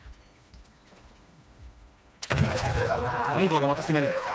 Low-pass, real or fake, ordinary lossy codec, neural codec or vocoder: none; fake; none; codec, 16 kHz, 2 kbps, FreqCodec, smaller model